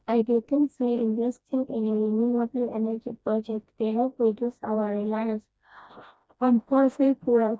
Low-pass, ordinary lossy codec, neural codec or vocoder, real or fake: none; none; codec, 16 kHz, 1 kbps, FreqCodec, smaller model; fake